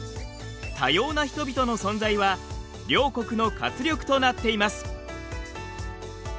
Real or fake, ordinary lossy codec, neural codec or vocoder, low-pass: real; none; none; none